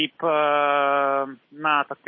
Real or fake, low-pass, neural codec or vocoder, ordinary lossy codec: real; 7.2 kHz; none; MP3, 24 kbps